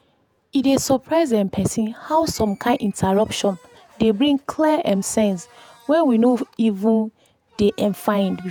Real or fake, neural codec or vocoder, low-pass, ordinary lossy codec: fake; vocoder, 48 kHz, 128 mel bands, Vocos; 19.8 kHz; none